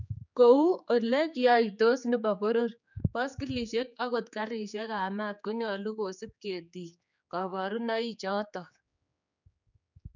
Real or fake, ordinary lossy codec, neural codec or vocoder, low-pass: fake; none; codec, 16 kHz, 4 kbps, X-Codec, HuBERT features, trained on general audio; 7.2 kHz